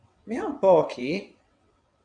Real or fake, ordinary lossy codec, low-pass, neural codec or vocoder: fake; MP3, 96 kbps; 9.9 kHz; vocoder, 22.05 kHz, 80 mel bands, WaveNeXt